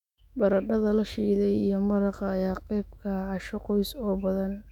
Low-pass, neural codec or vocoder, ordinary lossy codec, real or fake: 19.8 kHz; autoencoder, 48 kHz, 128 numbers a frame, DAC-VAE, trained on Japanese speech; none; fake